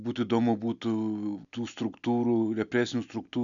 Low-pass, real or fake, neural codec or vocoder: 7.2 kHz; real; none